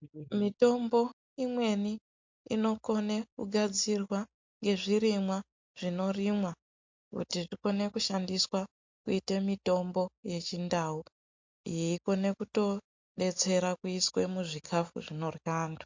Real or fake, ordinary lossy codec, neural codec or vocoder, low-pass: real; MP3, 48 kbps; none; 7.2 kHz